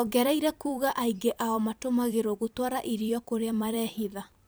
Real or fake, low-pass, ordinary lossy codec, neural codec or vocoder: fake; none; none; vocoder, 44.1 kHz, 128 mel bands every 256 samples, BigVGAN v2